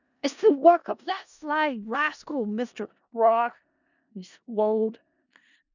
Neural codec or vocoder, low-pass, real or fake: codec, 16 kHz in and 24 kHz out, 0.4 kbps, LongCat-Audio-Codec, four codebook decoder; 7.2 kHz; fake